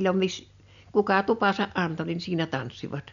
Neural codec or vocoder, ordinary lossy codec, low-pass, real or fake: none; none; 7.2 kHz; real